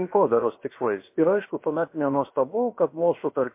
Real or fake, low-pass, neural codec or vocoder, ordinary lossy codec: fake; 3.6 kHz; codec, 16 kHz, about 1 kbps, DyCAST, with the encoder's durations; MP3, 24 kbps